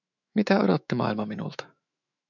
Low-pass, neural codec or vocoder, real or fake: 7.2 kHz; autoencoder, 48 kHz, 128 numbers a frame, DAC-VAE, trained on Japanese speech; fake